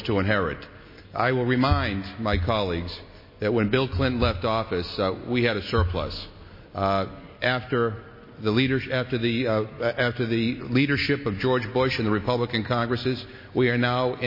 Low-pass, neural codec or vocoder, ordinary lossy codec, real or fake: 5.4 kHz; none; MP3, 24 kbps; real